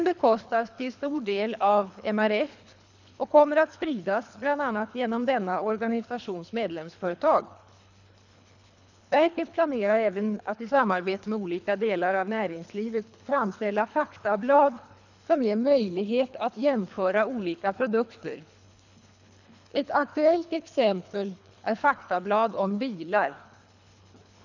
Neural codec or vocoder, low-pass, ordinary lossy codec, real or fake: codec, 24 kHz, 3 kbps, HILCodec; 7.2 kHz; none; fake